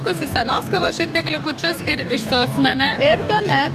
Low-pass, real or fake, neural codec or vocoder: 14.4 kHz; fake; codec, 44.1 kHz, 2.6 kbps, DAC